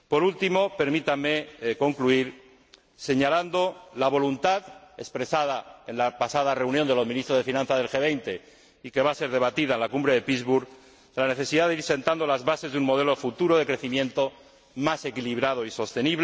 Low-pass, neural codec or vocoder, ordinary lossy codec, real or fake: none; none; none; real